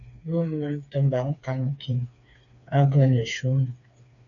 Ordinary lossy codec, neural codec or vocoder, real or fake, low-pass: AAC, 64 kbps; codec, 16 kHz, 4 kbps, FreqCodec, smaller model; fake; 7.2 kHz